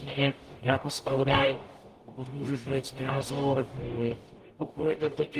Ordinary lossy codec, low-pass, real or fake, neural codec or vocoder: Opus, 24 kbps; 14.4 kHz; fake; codec, 44.1 kHz, 0.9 kbps, DAC